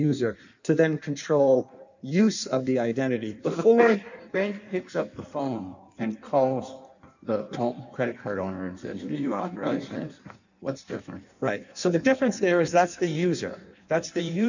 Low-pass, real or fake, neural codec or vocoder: 7.2 kHz; fake; codec, 16 kHz in and 24 kHz out, 1.1 kbps, FireRedTTS-2 codec